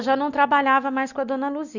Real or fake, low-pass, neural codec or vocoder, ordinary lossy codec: real; 7.2 kHz; none; none